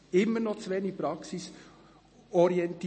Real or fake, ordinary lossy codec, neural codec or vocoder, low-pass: real; MP3, 32 kbps; none; 9.9 kHz